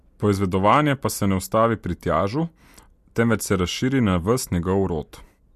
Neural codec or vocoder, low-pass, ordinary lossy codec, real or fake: none; 14.4 kHz; MP3, 64 kbps; real